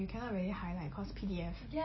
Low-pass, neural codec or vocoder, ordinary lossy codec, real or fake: 7.2 kHz; none; MP3, 24 kbps; real